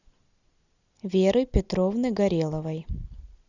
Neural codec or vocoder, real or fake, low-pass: none; real; 7.2 kHz